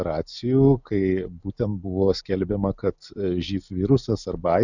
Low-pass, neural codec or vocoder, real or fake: 7.2 kHz; none; real